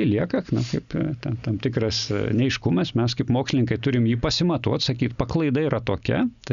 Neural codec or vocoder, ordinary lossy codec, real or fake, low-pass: none; MP3, 96 kbps; real; 7.2 kHz